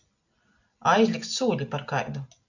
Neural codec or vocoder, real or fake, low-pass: none; real; 7.2 kHz